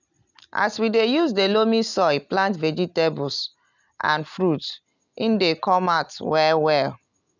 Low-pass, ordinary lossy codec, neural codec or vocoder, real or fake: 7.2 kHz; none; none; real